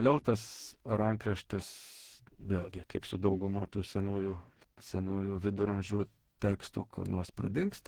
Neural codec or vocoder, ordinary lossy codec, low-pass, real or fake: codec, 44.1 kHz, 2.6 kbps, DAC; Opus, 16 kbps; 19.8 kHz; fake